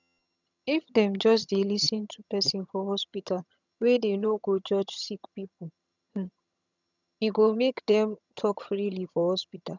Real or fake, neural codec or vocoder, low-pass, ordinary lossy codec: fake; vocoder, 22.05 kHz, 80 mel bands, HiFi-GAN; 7.2 kHz; none